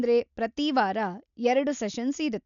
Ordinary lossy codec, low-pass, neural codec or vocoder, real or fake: none; 7.2 kHz; none; real